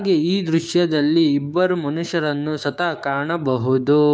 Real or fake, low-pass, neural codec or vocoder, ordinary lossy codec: fake; none; codec, 16 kHz, 6 kbps, DAC; none